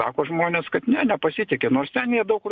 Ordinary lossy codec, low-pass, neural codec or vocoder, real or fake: Opus, 64 kbps; 7.2 kHz; none; real